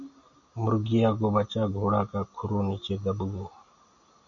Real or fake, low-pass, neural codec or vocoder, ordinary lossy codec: real; 7.2 kHz; none; Opus, 64 kbps